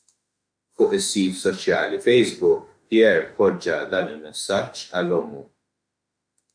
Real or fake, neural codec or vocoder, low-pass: fake; autoencoder, 48 kHz, 32 numbers a frame, DAC-VAE, trained on Japanese speech; 9.9 kHz